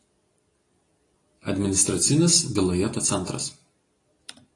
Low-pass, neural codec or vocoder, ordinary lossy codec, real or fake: 10.8 kHz; none; AAC, 32 kbps; real